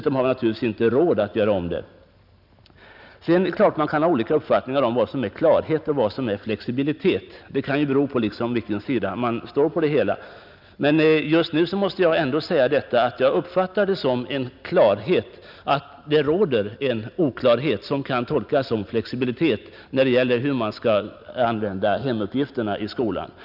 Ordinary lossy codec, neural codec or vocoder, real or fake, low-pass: none; none; real; 5.4 kHz